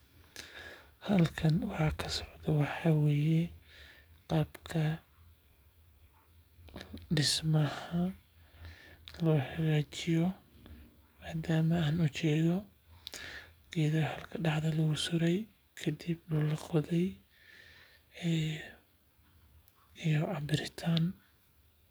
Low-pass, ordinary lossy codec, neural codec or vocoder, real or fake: none; none; codec, 44.1 kHz, 7.8 kbps, DAC; fake